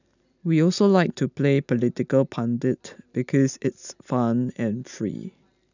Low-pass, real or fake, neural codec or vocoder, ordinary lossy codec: 7.2 kHz; real; none; none